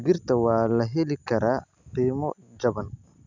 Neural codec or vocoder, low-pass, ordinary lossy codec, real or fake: none; 7.2 kHz; none; real